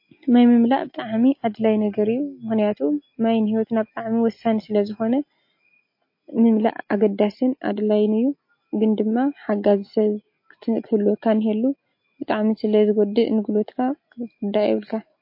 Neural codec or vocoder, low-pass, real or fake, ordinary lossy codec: none; 5.4 kHz; real; MP3, 32 kbps